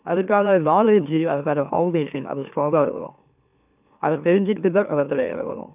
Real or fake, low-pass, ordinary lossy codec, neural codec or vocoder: fake; 3.6 kHz; none; autoencoder, 44.1 kHz, a latent of 192 numbers a frame, MeloTTS